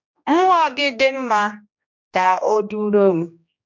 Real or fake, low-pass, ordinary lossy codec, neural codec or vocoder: fake; 7.2 kHz; MP3, 48 kbps; codec, 16 kHz, 1 kbps, X-Codec, HuBERT features, trained on general audio